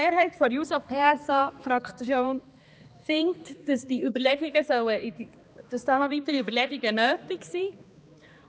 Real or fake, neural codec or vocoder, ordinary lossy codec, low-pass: fake; codec, 16 kHz, 2 kbps, X-Codec, HuBERT features, trained on balanced general audio; none; none